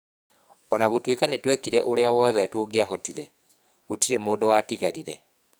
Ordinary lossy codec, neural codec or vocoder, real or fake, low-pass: none; codec, 44.1 kHz, 2.6 kbps, SNAC; fake; none